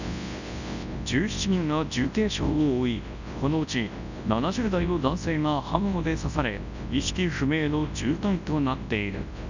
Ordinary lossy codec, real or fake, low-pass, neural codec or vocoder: none; fake; 7.2 kHz; codec, 24 kHz, 0.9 kbps, WavTokenizer, large speech release